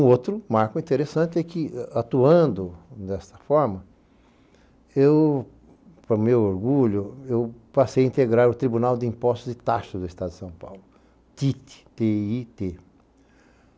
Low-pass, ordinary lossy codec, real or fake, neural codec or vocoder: none; none; real; none